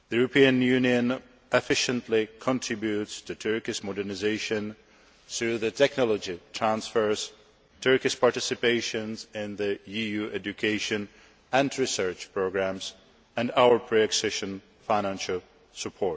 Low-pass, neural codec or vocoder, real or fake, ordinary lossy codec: none; none; real; none